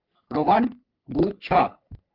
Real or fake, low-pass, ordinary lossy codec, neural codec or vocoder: fake; 5.4 kHz; Opus, 32 kbps; codec, 44.1 kHz, 3.4 kbps, Pupu-Codec